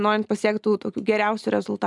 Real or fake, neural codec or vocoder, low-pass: real; none; 10.8 kHz